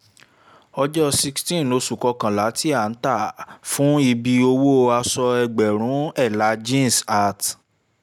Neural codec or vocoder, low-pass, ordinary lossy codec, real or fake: none; 19.8 kHz; none; real